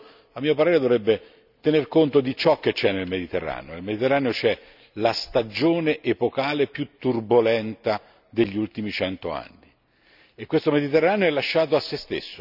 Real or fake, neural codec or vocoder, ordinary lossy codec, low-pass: real; none; none; 5.4 kHz